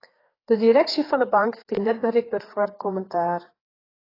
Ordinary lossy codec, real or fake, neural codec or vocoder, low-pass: AAC, 24 kbps; fake; codec, 16 kHz, 4 kbps, FreqCodec, larger model; 5.4 kHz